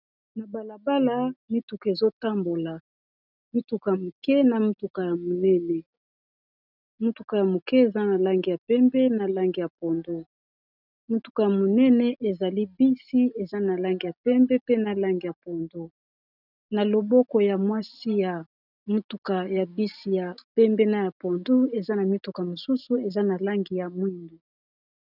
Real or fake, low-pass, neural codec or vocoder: real; 5.4 kHz; none